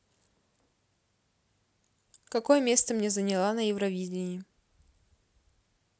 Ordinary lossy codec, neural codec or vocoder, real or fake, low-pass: none; none; real; none